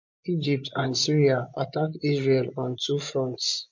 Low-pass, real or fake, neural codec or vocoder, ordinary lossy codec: 7.2 kHz; fake; vocoder, 24 kHz, 100 mel bands, Vocos; MP3, 48 kbps